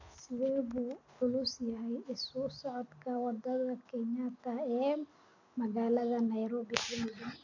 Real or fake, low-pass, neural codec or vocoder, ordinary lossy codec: real; 7.2 kHz; none; AAC, 48 kbps